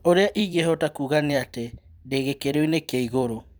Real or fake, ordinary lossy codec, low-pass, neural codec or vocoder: fake; none; none; vocoder, 44.1 kHz, 128 mel bands every 256 samples, BigVGAN v2